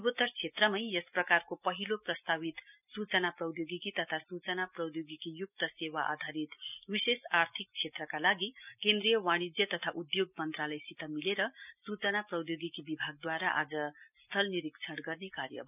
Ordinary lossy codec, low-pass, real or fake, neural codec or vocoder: none; 3.6 kHz; real; none